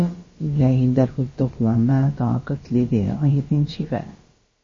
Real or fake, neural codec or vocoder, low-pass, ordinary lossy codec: fake; codec, 16 kHz, about 1 kbps, DyCAST, with the encoder's durations; 7.2 kHz; MP3, 32 kbps